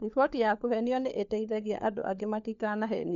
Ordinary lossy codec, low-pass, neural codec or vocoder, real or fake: none; 7.2 kHz; codec, 16 kHz, 4 kbps, FunCodec, trained on LibriTTS, 50 frames a second; fake